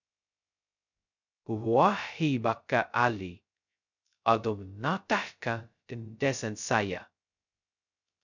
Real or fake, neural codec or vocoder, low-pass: fake; codec, 16 kHz, 0.2 kbps, FocalCodec; 7.2 kHz